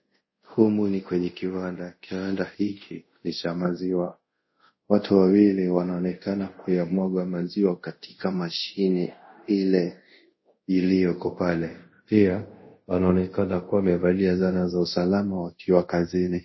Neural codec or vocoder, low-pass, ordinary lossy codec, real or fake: codec, 24 kHz, 0.5 kbps, DualCodec; 7.2 kHz; MP3, 24 kbps; fake